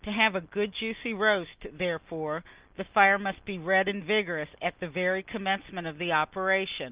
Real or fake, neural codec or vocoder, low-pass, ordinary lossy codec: real; none; 3.6 kHz; Opus, 32 kbps